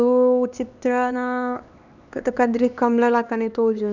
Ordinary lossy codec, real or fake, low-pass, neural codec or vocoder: none; fake; 7.2 kHz; codec, 16 kHz, 2 kbps, X-Codec, HuBERT features, trained on LibriSpeech